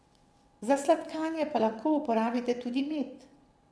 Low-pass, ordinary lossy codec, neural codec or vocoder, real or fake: none; none; vocoder, 22.05 kHz, 80 mel bands, WaveNeXt; fake